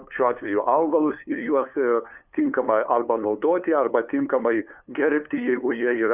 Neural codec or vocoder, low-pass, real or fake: codec, 16 kHz, 2 kbps, FunCodec, trained on LibriTTS, 25 frames a second; 3.6 kHz; fake